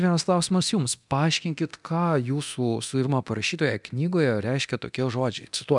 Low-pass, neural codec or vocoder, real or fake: 10.8 kHz; codec, 24 kHz, 0.9 kbps, DualCodec; fake